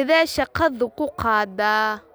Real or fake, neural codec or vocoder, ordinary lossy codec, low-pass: real; none; none; none